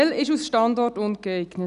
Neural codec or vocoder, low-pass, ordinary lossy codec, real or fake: none; 10.8 kHz; none; real